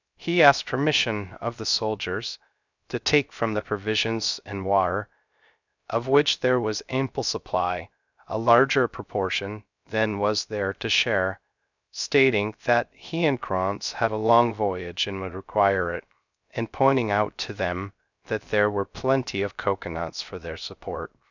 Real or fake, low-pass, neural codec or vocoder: fake; 7.2 kHz; codec, 16 kHz, 0.3 kbps, FocalCodec